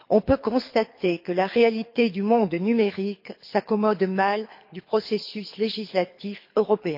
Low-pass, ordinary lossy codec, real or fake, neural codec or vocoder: 5.4 kHz; MP3, 32 kbps; fake; codec, 24 kHz, 6 kbps, HILCodec